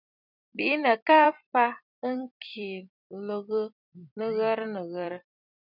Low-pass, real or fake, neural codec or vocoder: 5.4 kHz; fake; vocoder, 44.1 kHz, 128 mel bands every 256 samples, BigVGAN v2